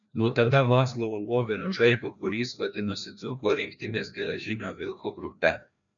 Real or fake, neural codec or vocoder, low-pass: fake; codec, 16 kHz, 1 kbps, FreqCodec, larger model; 7.2 kHz